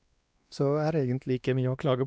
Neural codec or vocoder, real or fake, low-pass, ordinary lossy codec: codec, 16 kHz, 2 kbps, X-Codec, WavLM features, trained on Multilingual LibriSpeech; fake; none; none